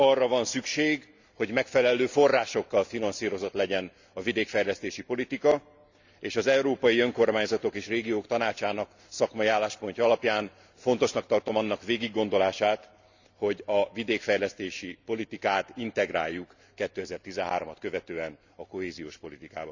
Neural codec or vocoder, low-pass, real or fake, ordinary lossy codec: none; 7.2 kHz; real; Opus, 64 kbps